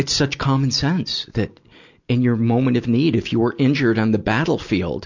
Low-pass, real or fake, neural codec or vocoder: 7.2 kHz; real; none